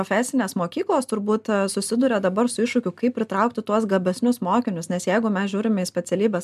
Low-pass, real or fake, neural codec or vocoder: 14.4 kHz; real; none